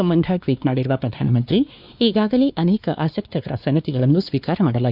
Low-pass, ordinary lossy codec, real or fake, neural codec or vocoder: 5.4 kHz; none; fake; codec, 16 kHz, 2 kbps, X-Codec, WavLM features, trained on Multilingual LibriSpeech